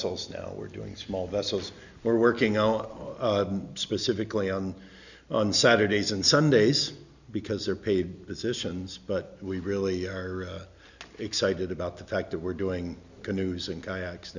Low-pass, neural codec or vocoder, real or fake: 7.2 kHz; none; real